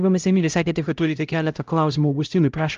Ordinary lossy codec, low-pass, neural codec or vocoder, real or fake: Opus, 32 kbps; 7.2 kHz; codec, 16 kHz, 0.5 kbps, X-Codec, HuBERT features, trained on LibriSpeech; fake